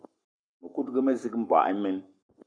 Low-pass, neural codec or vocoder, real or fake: 9.9 kHz; codec, 44.1 kHz, 7.8 kbps, Pupu-Codec; fake